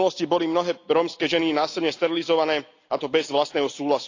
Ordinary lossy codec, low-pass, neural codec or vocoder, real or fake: AAC, 48 kbps; 7.2 kHz; none; real